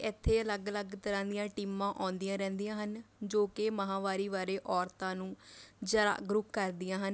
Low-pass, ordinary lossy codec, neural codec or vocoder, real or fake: none; none; none; real